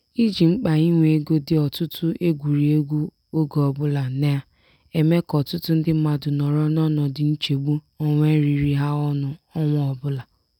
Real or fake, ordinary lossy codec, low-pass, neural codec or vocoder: real; none; 19.8 kHz; none